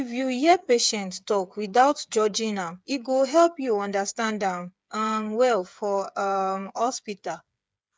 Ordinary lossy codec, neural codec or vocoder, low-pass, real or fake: none; codec, 16 kHz, 8 kbps, FreqCodec, smaller model; none; fake